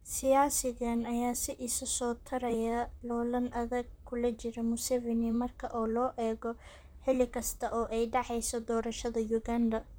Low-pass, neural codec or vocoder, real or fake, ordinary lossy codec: none; vocoder, 44.1 kHz, 128 mel bands, Pupu-Vocoder; fake; none